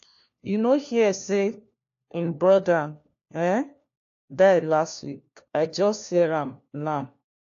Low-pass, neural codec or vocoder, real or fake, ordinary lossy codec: 7.2 kHz; codec, 16 kHz, 1 kbps, FunCodec, trained on LibriTTS, 50 frames a second; fake; AAC, 48 kbps